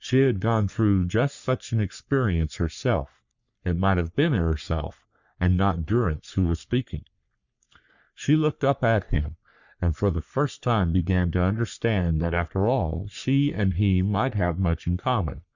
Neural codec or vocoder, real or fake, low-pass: codec, 44.1 kHz, 3.4 kbps, Pupu-Codec; fake; 7.2 kHz